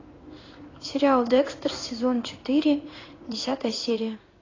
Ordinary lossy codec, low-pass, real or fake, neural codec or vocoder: AAC, 32 kbps; 7.2 kHz; fake; codec, 16 kHz in and 24 kHz out, 1 kbps, XY-Tokenizer